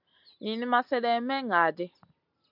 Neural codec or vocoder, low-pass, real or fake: none; 5.4 kHz; real